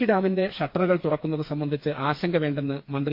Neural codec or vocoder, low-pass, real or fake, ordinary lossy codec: codec, 16 kHz, 4 kbps, FreqCodec, smaller model; 5.4 kHz; fake; MP3, 32 kbps